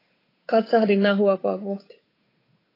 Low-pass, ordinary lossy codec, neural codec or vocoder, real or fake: 5.4 kHz; AAC, 24 kbps; codec, 16 kHz, 8 kbps, FunCodec, trained on Chinese and English, 25 frames a second; fake